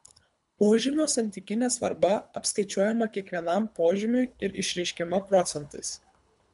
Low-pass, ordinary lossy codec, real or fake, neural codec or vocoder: 10.8 kHz; MP3, 64 kbps; fake; codec, 24 kHz, 3 kbps, HILCodec